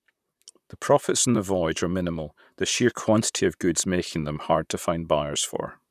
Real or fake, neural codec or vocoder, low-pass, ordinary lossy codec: fake; vocoder, 44.1 kHz, 128 mel bands, Pupu-Vocoder; 14.4 kHz; none